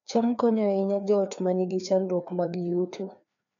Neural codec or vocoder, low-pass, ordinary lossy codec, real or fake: codec, 16 kHz, 2 kbps, FreqCodec, larger model; 7.2 kHz; none; fake